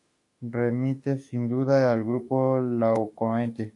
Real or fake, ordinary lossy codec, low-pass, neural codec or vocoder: fake; AAC, 48 kbps; 10.8 kHz; autoencoder, 48 kHz, 32 numbers a frame, DAC-VAE, trained on Japanese speech